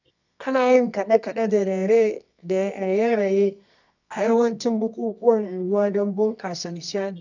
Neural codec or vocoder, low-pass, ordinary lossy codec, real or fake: codec, 24 kHz, 0.9 kbps, WavTokenizer, medium music audio release; 7.2 kHz; none; fake